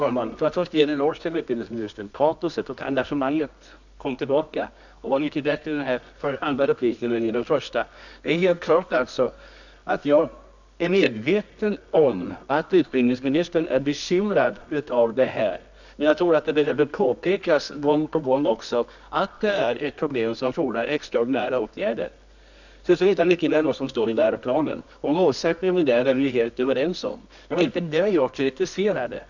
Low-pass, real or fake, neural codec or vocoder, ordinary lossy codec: 7.2 kHz; fake; codec, 24 kHz, 0.9 kbps, WavTokenizer, medium music audio release; none